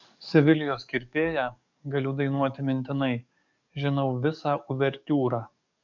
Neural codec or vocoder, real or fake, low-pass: codec, 44.1 kHz, 7.8 kbps, DAC; fake; 7.2 kHz